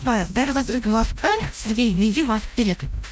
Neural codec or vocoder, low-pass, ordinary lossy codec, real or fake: codec, 16 kHz, 0.5 kbps, FreqCodec, larger model; none; none; fake